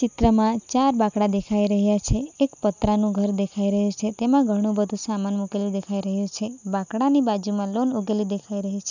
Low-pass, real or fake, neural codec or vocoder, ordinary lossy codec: 7.2 kHz; real; none; none